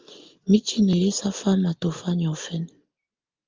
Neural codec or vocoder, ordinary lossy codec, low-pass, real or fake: none; Opus, 24 kbps; 7.2 kHz; real